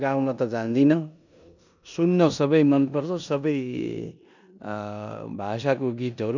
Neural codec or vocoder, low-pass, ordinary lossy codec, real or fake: codec, 16 kHz in and 24 kHz out, 0.9 kbps, LongCat-Audio-Codec, four codebook decoder; 7.2 kHz; none; fake